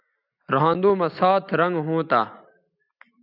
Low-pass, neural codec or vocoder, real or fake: 5.4 kHz; none; real